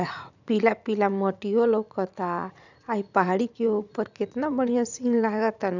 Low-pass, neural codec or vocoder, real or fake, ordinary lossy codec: 7.2 kHz; vocoder, 44.1 kHz, 80 mel bands, Vocos; fake; none